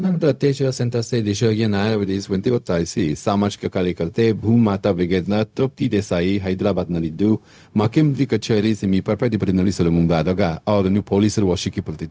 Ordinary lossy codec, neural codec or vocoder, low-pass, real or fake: none; codec, 16 kHz, 0.4 kbps, LongCat-Audio-Codec; none; fake